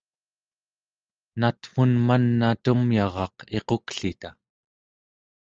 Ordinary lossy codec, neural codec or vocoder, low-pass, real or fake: Opus, 24 kbps; none; 7.2 kHz; real